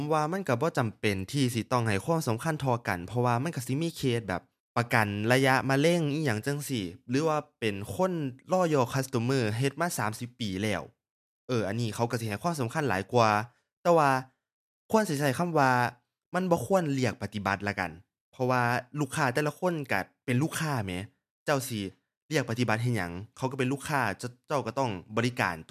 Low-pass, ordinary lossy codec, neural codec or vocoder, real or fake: 14.4 kHz; MP3, 96 kbps; none; real